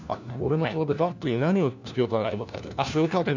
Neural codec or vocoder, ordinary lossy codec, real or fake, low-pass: codec, 16 kHz, 1 kbps, FunCodec, trained on LibriTTS, 50 frames a second; none; fake; 7.2 kHz